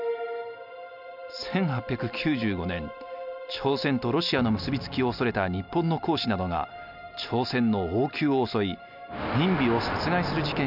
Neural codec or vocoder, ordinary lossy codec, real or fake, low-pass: none; none; real; 5.4 kHz